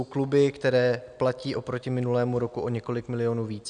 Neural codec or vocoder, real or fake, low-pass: none; real; 9.9 kHz